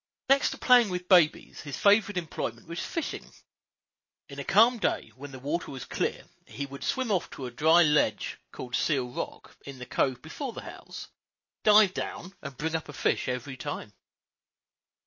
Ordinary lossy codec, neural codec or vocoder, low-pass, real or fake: MP3, 32 kbps; none; 7.2 kHz; real